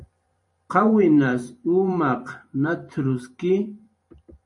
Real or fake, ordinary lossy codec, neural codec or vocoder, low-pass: fake; MP3, 48 kbps; vocoder, 44.1 kHz, 128 mel bands every 512 samples, BigVGAN v2; 10.8 kHz